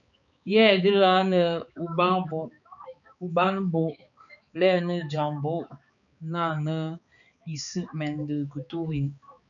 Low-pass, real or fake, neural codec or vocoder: 7.2 kHz; fake; codec, 16 kHz, 4 kbps, X-Codec, HuBERT features, trained on balanced general audio